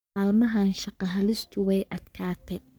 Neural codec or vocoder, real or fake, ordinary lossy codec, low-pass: codec, 44.1 kHz, 3.4 kbps, Pupu-Codec; fake; none; none